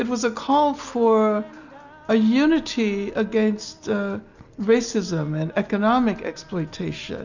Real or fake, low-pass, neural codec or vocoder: real; 7.2 kHz; none